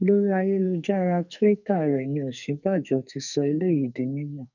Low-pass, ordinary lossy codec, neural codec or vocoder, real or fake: 7.2 kHz; none; codec, 44.1 kHz, 2.6 kbps, SNAC; fake